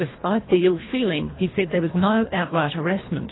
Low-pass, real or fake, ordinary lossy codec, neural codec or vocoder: 7.2 kHz; fake; AAC, 16 kbps; codec, 24 kHz, 1.5 kbps, HILCodec